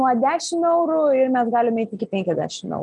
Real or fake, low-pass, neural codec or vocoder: real; 10.8 kHz; none